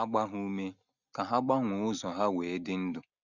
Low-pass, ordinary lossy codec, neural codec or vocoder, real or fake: 7.2 kHz; none; none; real